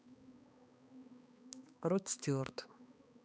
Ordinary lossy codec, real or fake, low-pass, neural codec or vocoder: none; fake; none; codec, 16 kHz, 2 kbps, X-Codec, HuBERT features, trained on balanced general audio